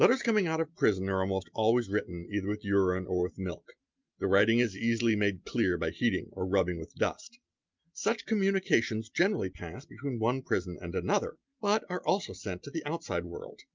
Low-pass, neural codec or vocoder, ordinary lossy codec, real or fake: 7.2 kHz; none; Opus, 24 kbps; real